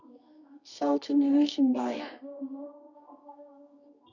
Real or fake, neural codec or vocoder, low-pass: fake; codec, 24 kHz, 0.9 kbps, WavTokenizer, medium music audio release; 7.2 kHz